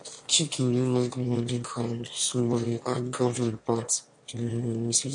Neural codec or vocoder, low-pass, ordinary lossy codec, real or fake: autoencoder, 22.05 kHz, a latent of 192 numbers a frame, VITS, trained on one speaker; 9.9 kHz; MP3, 48 kbps; fake